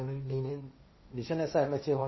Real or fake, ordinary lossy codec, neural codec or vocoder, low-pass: fake; MP3, 24 kbps; codec, 16 kHz, 1.1 kbps, Voila-Tokenizer; 7.2 kHz